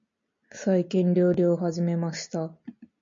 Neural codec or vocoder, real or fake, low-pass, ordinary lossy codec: none; real; 7.2 kHz; MP3, 48 kbps